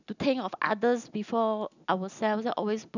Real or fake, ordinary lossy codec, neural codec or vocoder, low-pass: real; none; none; 7.2 kHz